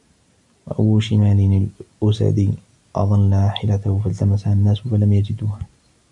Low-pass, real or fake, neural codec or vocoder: 10.8 kHz; real; none